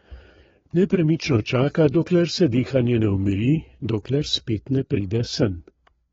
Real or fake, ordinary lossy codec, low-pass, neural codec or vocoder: fake; AAC, 24 kbps; 7.2 kHz; codec, 16 kHz, 4 kbps, FreqCodec, larger model